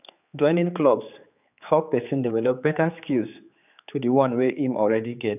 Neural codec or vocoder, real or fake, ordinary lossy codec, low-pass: codec, 16 kHz, 4 kbps, X-Codec, HuBERT features, trained on general audio; fake; none; 3.6 kHz